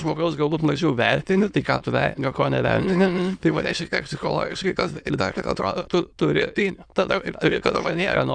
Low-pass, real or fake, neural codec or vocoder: 9.9 kHz; fake; autoencoder, 22.05 kHz, a latent of 192 numbers a frame, VITS, trained on many speakers